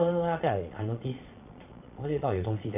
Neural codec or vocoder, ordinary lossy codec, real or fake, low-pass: vocoder, 22.05 kHz, 80 mel bands, Vocos; AAC, 32 kbps; fake; 3.6 kHz